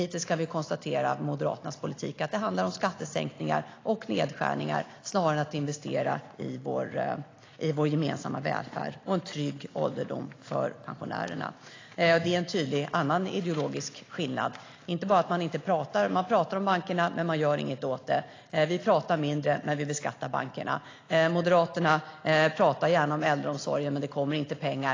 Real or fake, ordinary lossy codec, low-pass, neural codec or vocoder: real; AAC, 32 kbps; 7.2 kHz; none